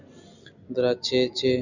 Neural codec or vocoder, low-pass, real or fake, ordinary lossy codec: none; 7.2 kHz; real; Opus, 64 kbps